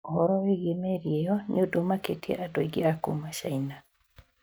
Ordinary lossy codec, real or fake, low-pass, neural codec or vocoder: none; real; none; none